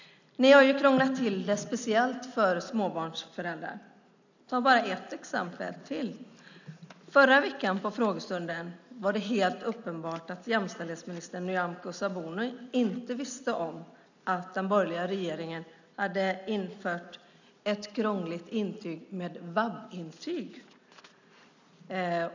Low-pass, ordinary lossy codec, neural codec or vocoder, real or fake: 7.2 kHz; none; none; real